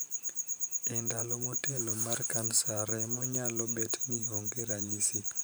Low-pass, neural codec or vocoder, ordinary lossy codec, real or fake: none; vocoder, 44.1 kHz, 128 mel bands every 512 samples, BigVGAN v2; none; fake